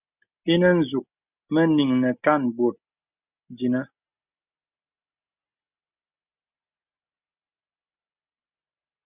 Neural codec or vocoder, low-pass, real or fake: none; 3.6 kHz; real